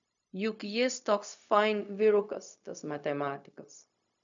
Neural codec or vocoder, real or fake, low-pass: codec, 16 kHz, 0.4 kbps, LongCat-Audio-Codec; fake; 7.2 kHz